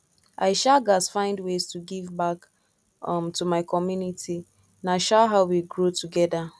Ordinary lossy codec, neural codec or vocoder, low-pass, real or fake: none; none; none; real